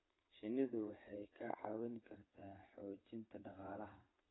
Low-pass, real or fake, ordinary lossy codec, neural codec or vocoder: 7.2 kHz; fake; AAC, 16 kbps; vocoder, 22.05 kHz, 80 mel bands, WaveNeXt